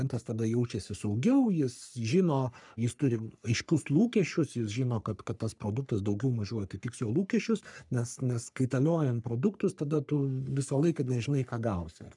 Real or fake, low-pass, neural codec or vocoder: fake; 10.8 kHz; codec, 44.1 kHz, 3.4 kbps, Pupu-Codec